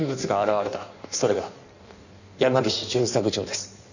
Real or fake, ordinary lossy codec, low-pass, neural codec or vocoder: fake; none; 7.2 kHz; codec, 16 kHz in and 24 kHz out, 1.1 kbps, FireRedTTS-2 codec